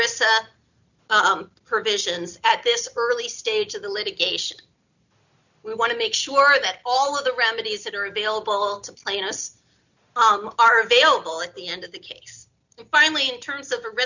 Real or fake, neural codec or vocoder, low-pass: real; none; 7.2 kHz